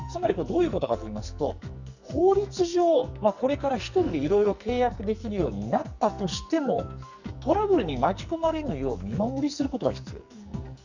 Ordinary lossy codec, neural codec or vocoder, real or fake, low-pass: none; codec, 44.1 kHz, 2.6 kbps, SNAC; fake; 7.2 kHz